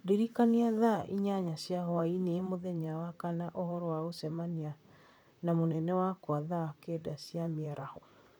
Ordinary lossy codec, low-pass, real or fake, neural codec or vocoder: none; none; fake; vocoder, 44.1 kHz, 128 mel bands, Pupu-Vocoder